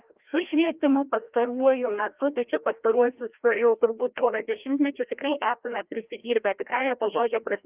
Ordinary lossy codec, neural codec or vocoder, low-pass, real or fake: Opus, 32 kbps; codec, 16 kHz, 1 kbps, FreqCodec, larger model; 3.6 kHz; fake